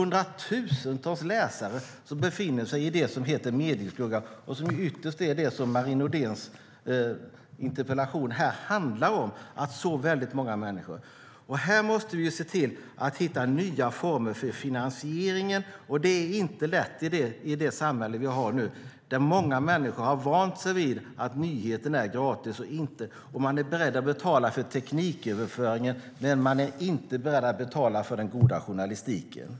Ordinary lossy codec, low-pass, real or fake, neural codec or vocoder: none; none; real; none